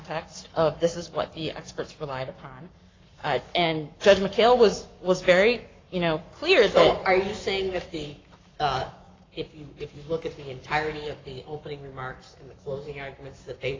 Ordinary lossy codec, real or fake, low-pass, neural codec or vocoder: AAC, 32 kbps; fake; 7.2 kHz; codec, 44.1 kHz, 7.8 kbps, Pupu-Codec